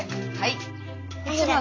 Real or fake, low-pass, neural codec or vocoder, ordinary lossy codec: real; 7.2 kHz; none; AAC, 32 kbps